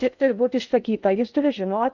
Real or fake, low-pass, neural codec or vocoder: fake; 7.2 kHz; codec, 16 kHz in and 24 kHz out, 0.6 kbps, FocalCodec, streaming, 2048 codes